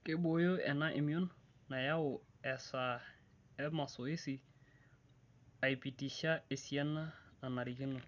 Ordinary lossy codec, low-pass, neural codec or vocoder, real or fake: none; 7.2 kHz; none; real